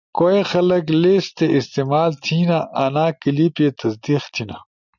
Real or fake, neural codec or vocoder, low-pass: real; none; 7.2 kHz